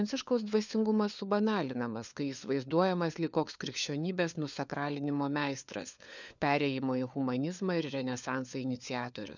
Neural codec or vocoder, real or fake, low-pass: codec, 16 kHz, 4 kbps, FunCodec, trained on LibriTTS, 50 frames a second; fake; 7.2 kHz